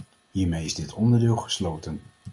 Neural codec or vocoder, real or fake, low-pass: none; real; 10.8 kHz